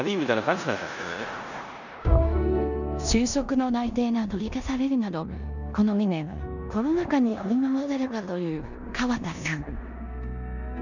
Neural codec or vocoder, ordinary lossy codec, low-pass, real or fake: codec, 16 kHz in and 24 kHz out, 0.9 kbps, LongCat-Audio-Codec, four codebook decoder; none; 7.2 kHz; fake